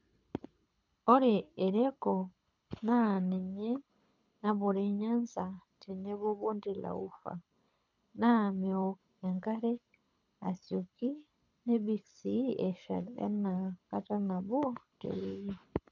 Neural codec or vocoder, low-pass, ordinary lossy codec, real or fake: codec, 24 kHz, 6 kbps, HILCodec; 7.2 kHz; none; fake